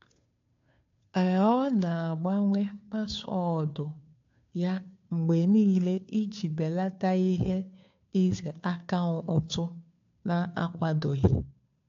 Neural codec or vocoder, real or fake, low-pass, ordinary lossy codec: codec, 16 kHz, 2 kbps, FunCodec, trained on Chinese and English, 25 frames a second; fake; 7.2 kHz; AAC, 48 kbps